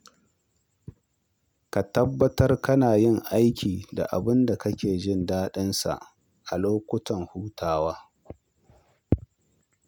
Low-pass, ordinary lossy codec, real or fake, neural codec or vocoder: none; none; real; none